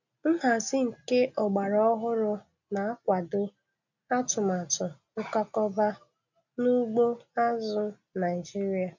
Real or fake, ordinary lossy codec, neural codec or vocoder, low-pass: real; none; none; 7.2 kHz